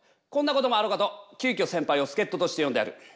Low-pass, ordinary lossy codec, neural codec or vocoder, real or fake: none; none; none; real